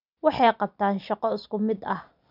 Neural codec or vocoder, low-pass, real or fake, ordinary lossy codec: none; 5.4 kHz; real; none